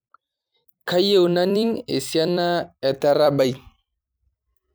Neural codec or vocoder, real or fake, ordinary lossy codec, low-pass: vocoder, 44.1 kHz, 128 mel bands every 256 samples, BigVGAN v2; fake; none; none